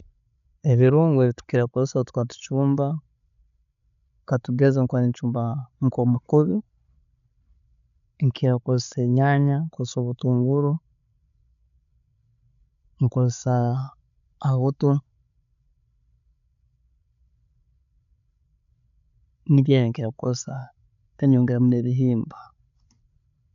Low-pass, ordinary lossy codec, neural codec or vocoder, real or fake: 7.2 kHz; none; codec, 16 kHz, 16 kbps, FreqCodec, larger model; fake